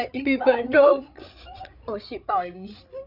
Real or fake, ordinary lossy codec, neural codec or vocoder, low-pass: fake; none; codec, 16 kHz, 8 kbps, FreqCodec, larger model; 5.4 kHz